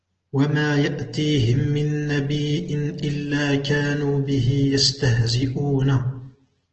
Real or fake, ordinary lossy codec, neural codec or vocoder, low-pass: real; Opus, 16 kbps; none; 7.2 kHz